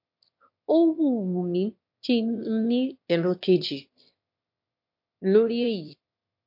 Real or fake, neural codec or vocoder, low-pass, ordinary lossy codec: fake; autoencoder, 22.05 kHz, a latent of 192 numbers a frame, VITS, trained on one speaker; 5.4 kHz; MP3, 32 kbps